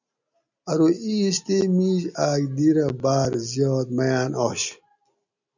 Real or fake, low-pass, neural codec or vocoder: real; 7.2 kHz; none